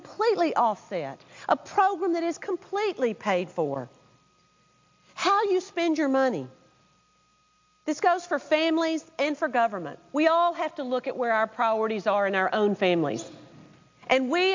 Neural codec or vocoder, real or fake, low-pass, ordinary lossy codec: none; real; 7.2 kHz; MP3, 64 kbps